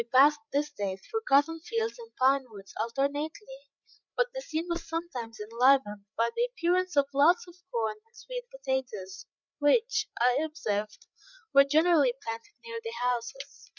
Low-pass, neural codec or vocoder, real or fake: 7.2 kHz; codec, 16 kHz, 8 kbps, FreqCodec, larger model; fake